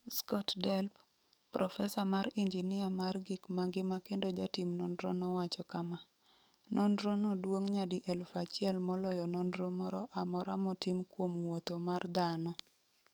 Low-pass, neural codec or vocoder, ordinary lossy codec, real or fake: none; codec, 44.1 kHz, 7.8 kbps, DAC; none; fake